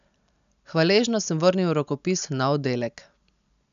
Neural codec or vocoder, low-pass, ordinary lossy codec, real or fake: none; 7.2 kHz; none; real